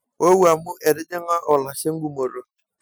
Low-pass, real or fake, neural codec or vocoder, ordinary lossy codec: none; real; none; none